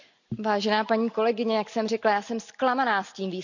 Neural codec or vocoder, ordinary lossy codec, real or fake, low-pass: none; none; real; 7.2 kHz